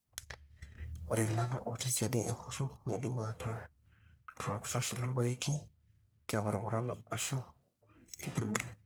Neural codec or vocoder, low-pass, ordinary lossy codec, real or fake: codec, 44.1 kHz, 1.7 kbps, Pupu-Codec; none; none; fake